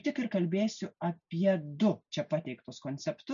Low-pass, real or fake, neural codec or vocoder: 7.2 kHz; real; none